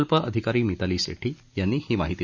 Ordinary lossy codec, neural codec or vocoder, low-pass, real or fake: Opus, 64 kbps; none; 7.2 kHz; real